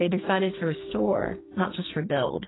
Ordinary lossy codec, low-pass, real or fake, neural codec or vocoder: AAC, 16 kbps; 7.2 kHz; fake; codec, 44.1 kHz, 2.6 kbps, SNAC